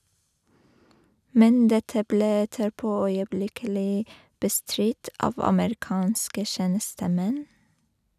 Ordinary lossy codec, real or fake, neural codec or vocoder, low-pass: none; real; none; 14.4 kHz